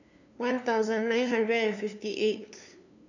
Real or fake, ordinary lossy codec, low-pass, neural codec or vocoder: fake; none; 7.2 kHz; codec, 16 kHz, 2 kbps, FunCodec, trained on LibriTTS, 25 frames a second